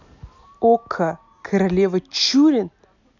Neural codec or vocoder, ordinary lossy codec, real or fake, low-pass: none; none; real; 7.2 kHz